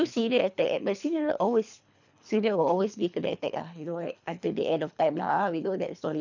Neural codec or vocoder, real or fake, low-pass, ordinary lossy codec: codec, 24 kHz, 3 kbps, HILCodec; fake; 7.2 kHz; none